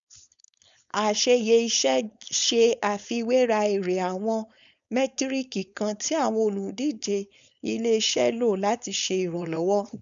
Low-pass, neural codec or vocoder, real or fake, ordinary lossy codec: 7.2 kHz; codec, 16 kHz, 4.8 kbps, FACodec; fake; none